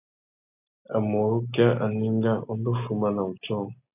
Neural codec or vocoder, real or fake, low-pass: none; real; 3.6 kHz